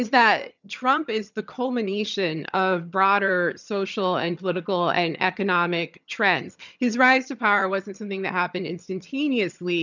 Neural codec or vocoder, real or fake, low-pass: vocoder, 22.05 kHz, 80 mel bands, HiFi-GAN; fake; 7.2 kHz